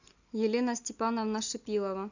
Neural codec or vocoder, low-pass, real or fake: none; 7.2 kHz; real